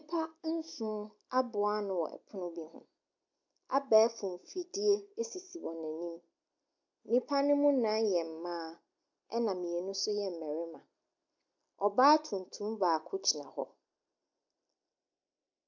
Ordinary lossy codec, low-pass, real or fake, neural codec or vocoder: MP3, 64 kbps; 7.2 kHz; real; none